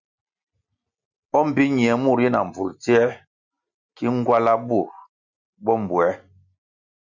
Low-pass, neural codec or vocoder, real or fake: 7.2 kHz; none; real